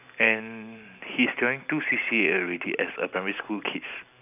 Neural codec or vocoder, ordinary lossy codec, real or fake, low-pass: none; none; real; 3.6 kHz